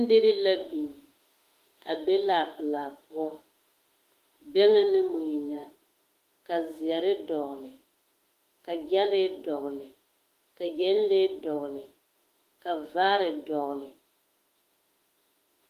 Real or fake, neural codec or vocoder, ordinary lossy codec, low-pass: fake; autoencoder, 48 kHz, 32 numbers a frame, DAC-VAE, trained on Japanese speech; Opus, 24 kbps; 14.4 kHz